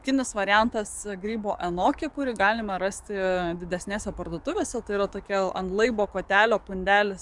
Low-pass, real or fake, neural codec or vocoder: 10.8 kHz; fake; codec, 44.1 kHz, 7.8 kbps, DAC